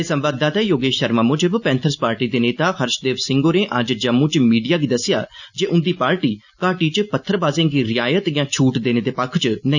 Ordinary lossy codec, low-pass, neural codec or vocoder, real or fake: none; 7.2 kHz; none; real